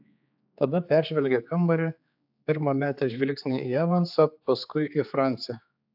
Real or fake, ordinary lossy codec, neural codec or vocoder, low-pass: fake; AAC, 48 kbps; codec, 16 kHz, 4 kbps, X-Codec, HuBERT features, trained on general audio; 5.4 kHz